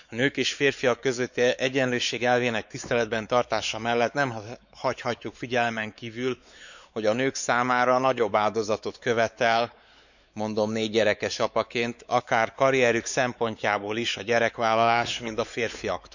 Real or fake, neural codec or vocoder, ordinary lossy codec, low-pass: fake; codec, 16 kHz, 4 kbps, X-Codec, WavLM features, trained on Multilingual LibriSpeech; none; 7.2 kHz